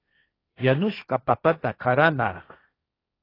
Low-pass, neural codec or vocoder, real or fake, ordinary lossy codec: 5.4 kHz; codec, 16 kHz, 1.1 kbps, Voila-Tokenizer; fake; AAC, 24 kbps